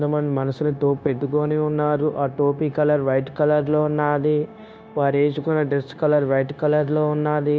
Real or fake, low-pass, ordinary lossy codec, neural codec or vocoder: fake; none; none; codec, 16 kHz, 0.9 kbps, LongCat-Audio-Codec